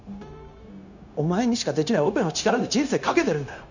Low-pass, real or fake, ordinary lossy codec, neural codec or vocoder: 7.2 kHz; fake; none; codec, 16 kHz, 0.9 kbps, LongCat-Audio-Codec